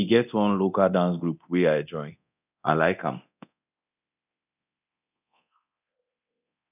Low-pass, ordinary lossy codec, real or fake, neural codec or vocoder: 3.6 kHz; none; fake; codec, 24 kHz, 0.9 kbps, DualCodec